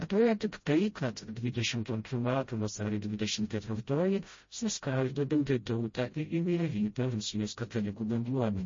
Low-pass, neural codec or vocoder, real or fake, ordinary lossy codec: 7.2 kHz; codec, 16 kHz, 0.5 kbps, FreqCodec, smaller model; fake; MP3, 32 kbps